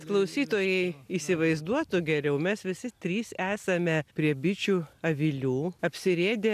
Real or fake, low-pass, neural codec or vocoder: real; 14.4 kHz; none